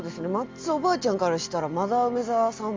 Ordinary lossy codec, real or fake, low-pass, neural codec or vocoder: Opus, 32 kbps; real; 7.2 kHz; none